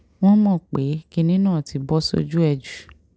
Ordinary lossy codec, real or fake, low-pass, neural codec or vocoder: none; real; none; none